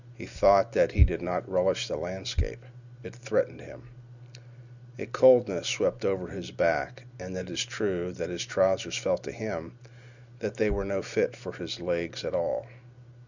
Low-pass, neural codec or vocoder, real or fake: 7.2 kHz; none; real